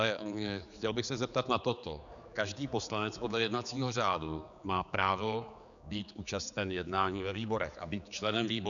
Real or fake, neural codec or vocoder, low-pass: fake; codec, 16 kHz, 4 kbps, X-Codec, HuBERT features, trained on general audio; 7.2 kHz